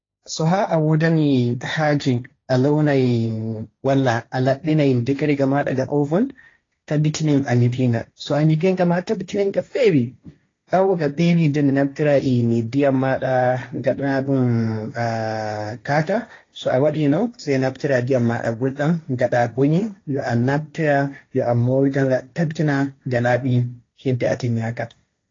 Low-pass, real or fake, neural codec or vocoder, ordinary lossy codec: 7.2 kHz; fake; codec, 16 kHz, 1.1 kbps, Voila-Tokenizer; AAC, 32 kbps